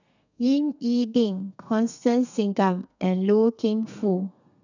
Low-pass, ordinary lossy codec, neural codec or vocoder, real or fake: 7.2 kHz; none; codec, 32 kHz, 1.9 kbps, SNAC; fake